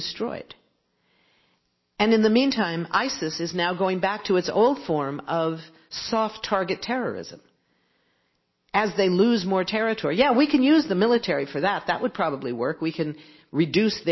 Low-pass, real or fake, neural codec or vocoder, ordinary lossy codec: 7.2 kHz; real; none; MP3, 24 kbps